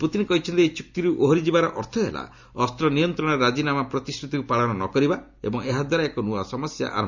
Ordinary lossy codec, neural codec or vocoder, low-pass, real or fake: Opus, 64 kbps; none; 7.2 kHz; real